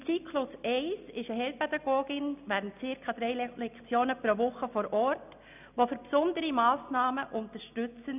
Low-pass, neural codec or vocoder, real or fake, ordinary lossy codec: 3.6 kHz; none; real; none